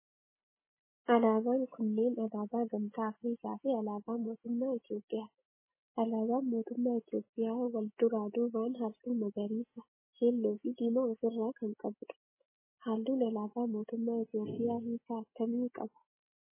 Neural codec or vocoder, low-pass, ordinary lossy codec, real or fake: none; 3.6 kHz; MP3, 16 kbps; real